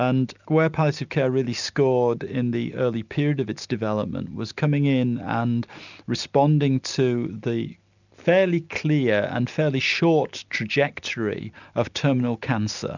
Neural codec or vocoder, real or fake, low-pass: none; real; 7.2 kHz